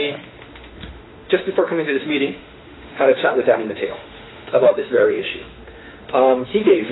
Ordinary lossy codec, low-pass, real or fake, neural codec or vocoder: AAC, 16 kbps; 7.2 kHz; fake; autoencoder, 48 kHz, 32 numbers a frame, DAC-VAE, trained on Japanese speech